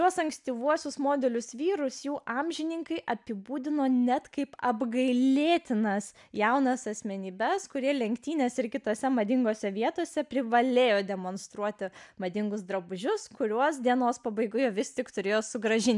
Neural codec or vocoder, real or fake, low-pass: none; real; 10.8 kHz